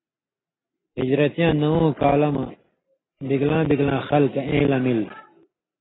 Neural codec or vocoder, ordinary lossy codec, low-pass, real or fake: none; AAC, 16 kbps; 7.2 kHz; real